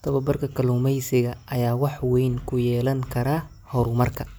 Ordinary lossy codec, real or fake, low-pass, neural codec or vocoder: none; real; none; none